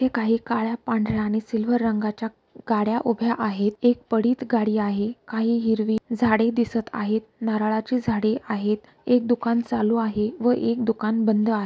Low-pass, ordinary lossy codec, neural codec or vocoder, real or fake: none; none; none; real